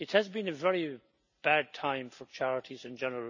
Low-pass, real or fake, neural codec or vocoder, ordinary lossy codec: 7.2 kHz; real; none; none